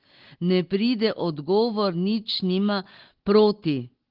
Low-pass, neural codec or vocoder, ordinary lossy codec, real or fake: 5.4 kHz; none; Opus, 16 kbps; real